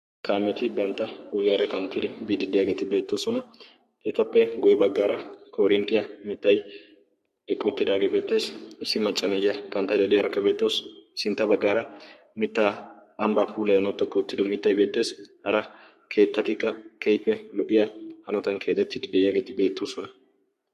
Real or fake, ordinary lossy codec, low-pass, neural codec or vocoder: fake; MP3, 64 kbps; 14.4 kHz; codec, 44.1 kHz, 3.4 kbps, Pupu-Codec